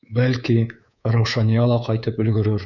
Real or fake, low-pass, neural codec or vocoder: fake; 7.2 kHz; codec, 24 kHz, 3.1 kbps, DualCodec